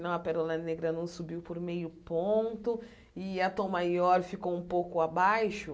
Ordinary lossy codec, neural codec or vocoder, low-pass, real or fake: none; none; none; real